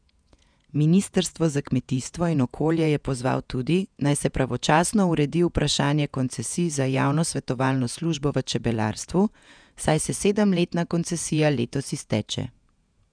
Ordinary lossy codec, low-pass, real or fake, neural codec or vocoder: none; 9.9 kHz; fake; vocoder, 48 kHz, 128 mel bands, Vocos